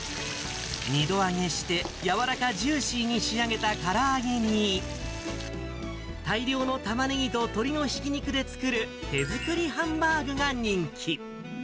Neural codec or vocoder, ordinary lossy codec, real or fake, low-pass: none; none; real; none